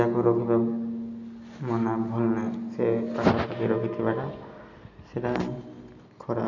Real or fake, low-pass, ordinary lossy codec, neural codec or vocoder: real; 7.2 kHz; none; none